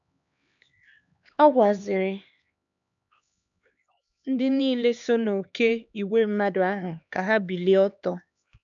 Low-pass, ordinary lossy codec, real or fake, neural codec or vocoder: 7.2 kHz; none; fake; codec, 16 kHz, 2 kbps, X-Codec, HuBERT features, trained on LibriSpeech